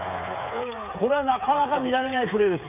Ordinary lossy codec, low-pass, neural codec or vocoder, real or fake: none; 3.6 kHz; codec, 16 kHz, 8 kbps, FreqCodec, smaller model; fake